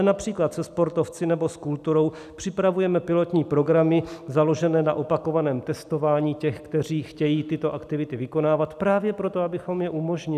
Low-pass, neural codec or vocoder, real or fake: 14.4 kHz; none; real